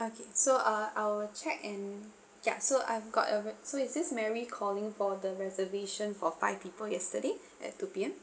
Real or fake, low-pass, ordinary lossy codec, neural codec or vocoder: real; none; none; none